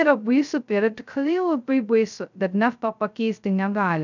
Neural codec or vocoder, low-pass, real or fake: codec, 16 kHz, 0.2 kbps, FocalCodec; 7.2 kHz; fake